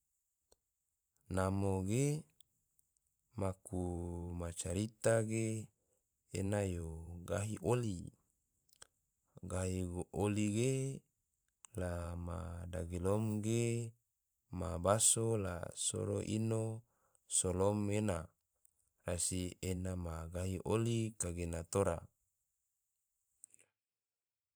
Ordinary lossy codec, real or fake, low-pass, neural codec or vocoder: none; fake; none; vocoder, 44.1 kHz, 128 mel bands every 512 samples, BigVGAN v2